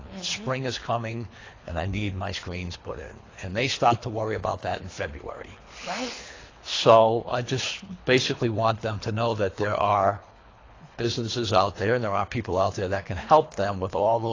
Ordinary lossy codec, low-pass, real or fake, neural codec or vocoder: AAC, 32 kbps; 7.2 kHz; fake; codec, 24 kHz, 3 kbps, HILCodec